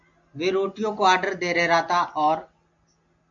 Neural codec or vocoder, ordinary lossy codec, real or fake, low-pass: none; AAC, 64 kbps; real; 7.2 kHz